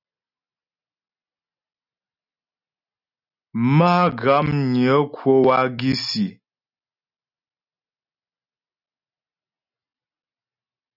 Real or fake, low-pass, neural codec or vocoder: real; 5.4 kHz; none